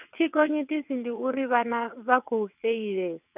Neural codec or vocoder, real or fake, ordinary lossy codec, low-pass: vocoder, 22.05 kHz, 80 mel bands, WaveNeXt; fake; none; 3.6 kHz